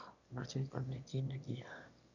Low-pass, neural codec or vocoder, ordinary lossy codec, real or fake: 7.2 kHz; autoencoder, 22.05 kHz, a latent of 192 numbers a frame, VITS, trained on one speaker; none; fake